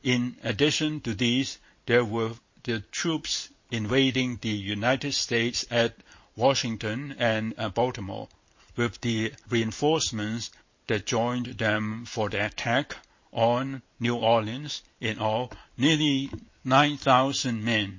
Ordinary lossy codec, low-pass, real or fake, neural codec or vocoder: MP3, 32 kbps; 7.2 kHz; fake; vocoder, 44.1 kHz, 128 mel bands every 512 samples, BigVGAN v2